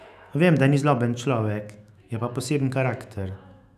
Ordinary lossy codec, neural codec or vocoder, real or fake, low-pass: none; autoencoder, 48 kHz, 128 numbers a frame, DAC-VAE, trained on Japanese speech; fake; 14.4 kHz